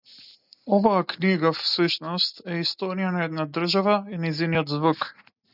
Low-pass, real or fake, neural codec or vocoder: 5.4 kHz; real; none